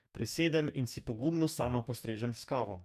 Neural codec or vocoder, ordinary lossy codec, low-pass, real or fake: codec, 44.1 kHz, 2.6 kbps, DAC; none; 14.4 kHz; fake